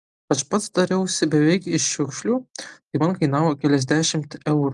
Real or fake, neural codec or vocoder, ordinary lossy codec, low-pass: real; none; Opus, 32 kbps; 10.8 kHz